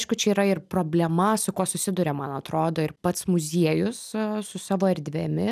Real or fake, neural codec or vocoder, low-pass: real; none; 14.4 kHz